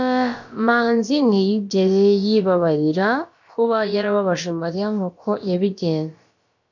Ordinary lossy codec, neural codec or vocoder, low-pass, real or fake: MP3, 48 kbps; codec, 16 kHz, about 1 kbps, DyCAST, with the encoder's durations; 7.2 kHz; fake